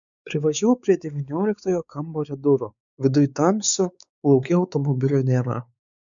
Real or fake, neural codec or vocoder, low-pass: fake; codec, 16 kHz, 4 kbps, X-Codec, WavLM features, trained on Multilingual LibriSpeech; 7.2 kHz